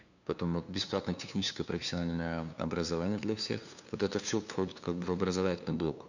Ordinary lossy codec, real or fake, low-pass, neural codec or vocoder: none; fake; 7.2 kHz; codec, 16 kHz, 2 kbps, FunCodec, trained on LibriTTS, 25 frames a second